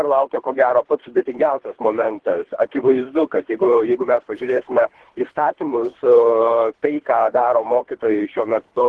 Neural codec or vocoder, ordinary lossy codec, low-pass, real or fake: codec, 24 kHz, 3 kbps, HILCodec; Opus, 16 kbps; 10.8 kHz; fake